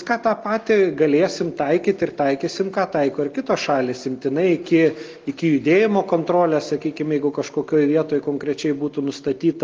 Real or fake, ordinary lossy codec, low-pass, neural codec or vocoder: real; Opus, 32 kbps; 7.2 kHz; none